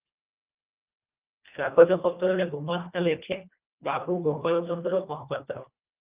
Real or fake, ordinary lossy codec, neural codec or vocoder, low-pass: fake; Opus, 24 kbps; codec, 24 kHz, 1.5 kbps, HILCodec; 3.6 kHz